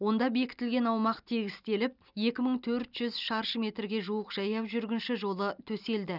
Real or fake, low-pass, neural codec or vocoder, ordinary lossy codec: real; 5.4 kHz; none; none